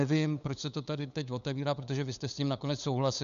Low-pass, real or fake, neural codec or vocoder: 7.2 kHz; fake; codec, 16 kHz, 8 kbps, FunCodec, trained on LibriTTS, 25 frames a second